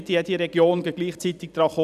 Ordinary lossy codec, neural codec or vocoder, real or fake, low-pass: none; vocoder, 44.1 kHz, 128 mel bands every 256 samples, BigVGAN v2; fake; 14.4 kHz